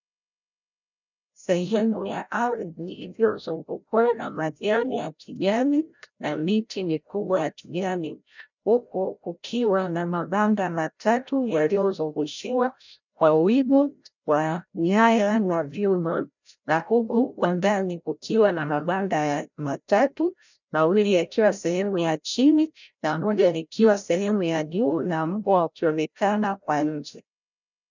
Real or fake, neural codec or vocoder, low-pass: fake; codec, 16 kHz, 0.5 kbps, FreqCodec, larger model; 7.2 kHz